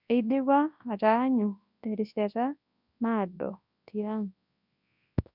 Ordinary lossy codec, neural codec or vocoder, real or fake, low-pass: none; codec, 24 kHz, 0.9 kbps, WavTokenizer, large speech release; fake; 5.4 kHz